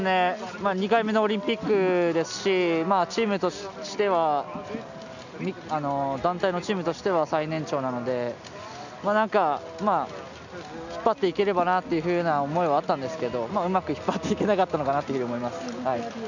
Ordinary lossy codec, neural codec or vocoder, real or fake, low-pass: none; none; real; 7.2 kHz